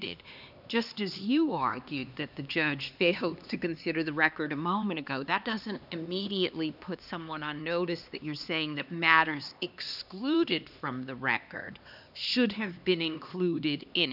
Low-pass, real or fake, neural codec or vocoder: 5.4 kHz; fake; codec, 16 kHz, 2 kbps, X-Codec, HuBERT features, trained on LibriSpeech